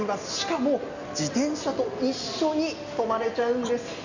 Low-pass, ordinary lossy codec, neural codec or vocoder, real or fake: 7.2 kHz; none; none; real